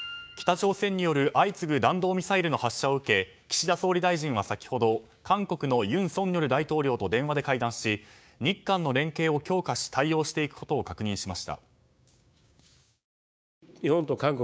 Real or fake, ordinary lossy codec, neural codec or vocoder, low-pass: fake; none; codec, 16 kHz, 6 kbps, DAC; none